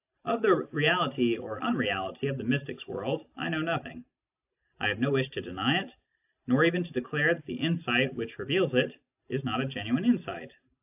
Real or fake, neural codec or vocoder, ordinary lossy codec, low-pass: real; none; AAC, 32 kbps; 3.6 kHz